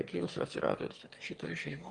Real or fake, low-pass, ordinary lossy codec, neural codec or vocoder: fake; 9.9 kHz; Opus, 24 kbps; autoencoder, 22.05 kHz, a latent of 192 numbers a frame, VITS, trained on one speaker